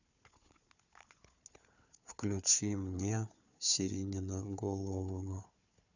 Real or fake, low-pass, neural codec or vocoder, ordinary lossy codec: fake; 7.2 kHz; codec, 16 kHz, 4 kbps, FunCodec, trained on Chinese and English, 50 frames a second; none